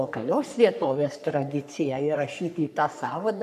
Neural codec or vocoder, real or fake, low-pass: codec, 44.1 kHz, 3.4 kbps, Pupu-Codec; fake; 14.4 kHz